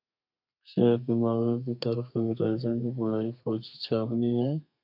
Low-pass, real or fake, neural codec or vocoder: 5.4 kHz; fake; codec, 32 kHz, 1.9 kbps, SNAC